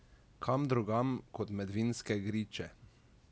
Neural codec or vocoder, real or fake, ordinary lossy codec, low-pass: none; real; none; none